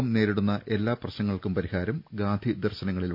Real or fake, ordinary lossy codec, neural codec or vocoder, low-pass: real; none; none; 5.4 kHz